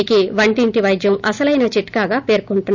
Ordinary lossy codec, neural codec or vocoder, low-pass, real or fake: none; none; 7.2 kHz; real